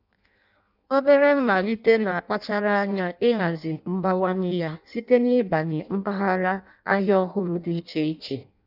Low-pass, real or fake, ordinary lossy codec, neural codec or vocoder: 5.4 kHz; fake; none; codec, 16 kHz in and 24 kHz out, 0.6 kbps, FireRedTTS-2 codec